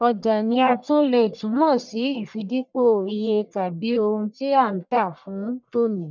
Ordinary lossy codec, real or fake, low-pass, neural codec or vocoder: none; fake; 7.2 kHz; codec, 44.1 kHz, 1.7 kbps, Pupu-Codec